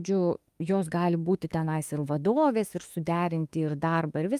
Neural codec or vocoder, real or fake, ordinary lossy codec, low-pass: autoencoder, 48 kHz, 32 numbers a frame, DAC-VAE, trained on Japanese speech; fake; Opus, 32 kbps; 14.4 kHz